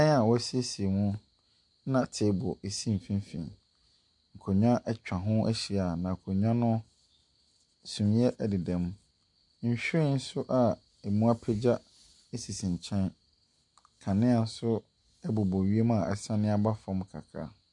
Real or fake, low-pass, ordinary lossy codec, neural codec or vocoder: real; 10.8 kHz; MP3, 64 kbps; none